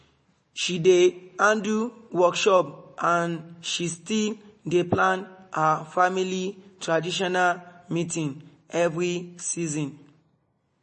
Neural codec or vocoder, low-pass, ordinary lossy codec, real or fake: none; 10.8 kHz; MP3, 32 kbps; real